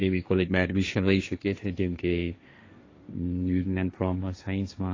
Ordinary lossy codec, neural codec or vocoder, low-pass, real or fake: AAC, 32 kbps; codec, 16 kHz, 1.1 kbps, Voila-Tokenizer; 7.2 kHz; fake